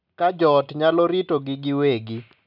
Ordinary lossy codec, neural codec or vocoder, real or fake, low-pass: none; none; real; 5.4 kHz